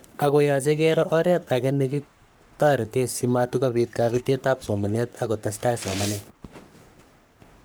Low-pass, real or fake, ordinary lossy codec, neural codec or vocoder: none; fake; none; codec, 44.1 kHz, 3.4 kbps, Pupu-Codec